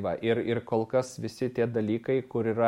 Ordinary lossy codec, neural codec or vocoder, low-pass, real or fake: MP3, 64 kbps; none; 19.8 kHz; real